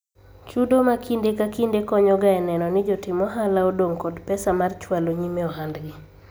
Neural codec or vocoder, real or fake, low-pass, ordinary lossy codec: none; real; none; none